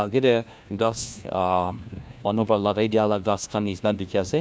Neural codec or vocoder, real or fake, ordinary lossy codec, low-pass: codec, 16 kHz, 1 kbps, FunCodec, trained on LibriTTS, 50 frames a second; fake; none; none